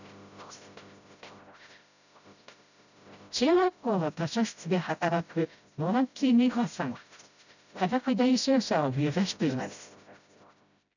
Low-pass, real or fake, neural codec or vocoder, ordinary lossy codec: 7.2 kHz; fake; codec, 16 kHz, 0.5 kbps, FreqCodec, smaller model; none